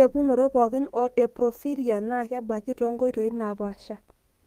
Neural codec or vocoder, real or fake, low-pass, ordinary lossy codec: codec, 32 kHz, 1.9 kbps, SNAC; fake; 14.4 kHz; Opus, 32 kbps